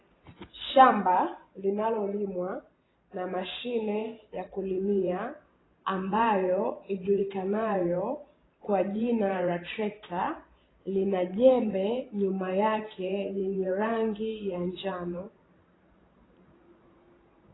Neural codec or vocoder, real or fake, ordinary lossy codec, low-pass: vocoder, 44.1 kHz, 128 mel bands every 512 samples, BigVGAN v2; fake; AAC, 16 kbps; 7.2 kHz